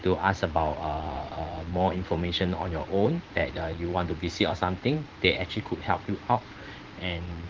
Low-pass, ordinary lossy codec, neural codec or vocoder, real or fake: 7.2 kHz; Opus, 16 kbps; vocoder, 44.1 kHz, 80 mel bands, Vocos; fake